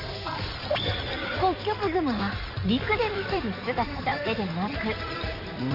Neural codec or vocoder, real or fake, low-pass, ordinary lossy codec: codec, 16 kHz in and 24 kHz out, 2.2 kbps, FireRedTTS-2 codec; fake; 5.4 kHz; none